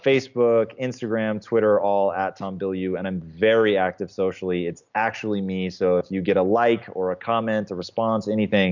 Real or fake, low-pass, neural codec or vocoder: real; 7.2 kHz; none